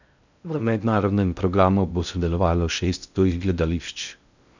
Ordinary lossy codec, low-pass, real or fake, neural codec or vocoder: none; 7.2 kHz; fake; codec, 16 kHz in and 24 kHz out, 0.6 kbps, FocalCodec, streaming, 2048 codes